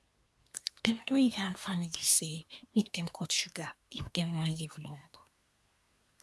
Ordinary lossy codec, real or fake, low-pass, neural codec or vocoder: none; fake; none; codec, 24 kHz, 1 kbps, SNAC